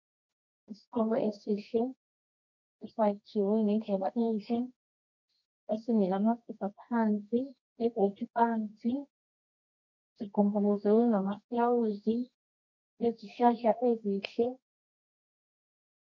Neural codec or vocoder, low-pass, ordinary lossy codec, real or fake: codec, 24 kHz, 0.9 kbps, WavTokenizer, medium music audio release; 7.2 kHz; MP3, 48 kbps; fake